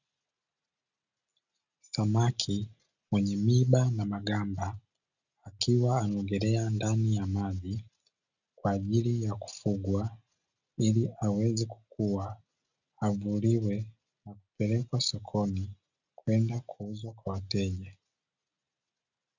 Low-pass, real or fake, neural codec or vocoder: 7.2 kHz; real; none